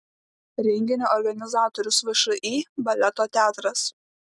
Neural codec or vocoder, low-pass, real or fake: none; 10.8 kHz; real